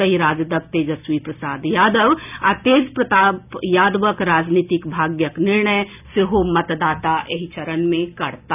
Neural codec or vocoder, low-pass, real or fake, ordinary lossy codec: none; 3.6 kHz; real; none